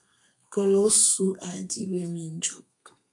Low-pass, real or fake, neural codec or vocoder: 10.8 kHz; fake; codec, 32 kHz, 1.9 kbps, SNAC